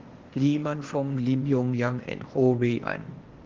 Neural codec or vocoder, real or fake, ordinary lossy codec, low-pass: codec, 16 kHz, 0.8 kbps, ZipCodec; fake; Opus, 16 kbps; 7.2 kHz